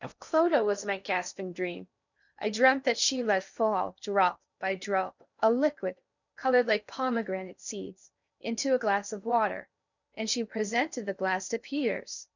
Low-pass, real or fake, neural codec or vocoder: 7.2 kHz; fake; codec, 16 kHz in and 24 kHz out, 0.6 kbps, FocalCodec, streaming, 4096 codes